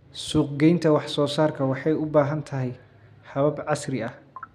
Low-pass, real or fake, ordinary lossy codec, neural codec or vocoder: 14.4 kHz; real; none; none